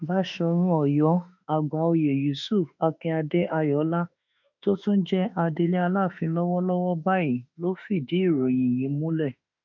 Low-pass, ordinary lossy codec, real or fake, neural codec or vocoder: 7.2 kHz; none; fake; autoencoder, 48 kHz, 32 numbers a frame, DAC-VAE, trained on Japanese speech